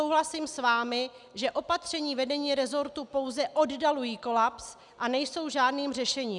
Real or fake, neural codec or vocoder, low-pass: real; none; 10.8 kHz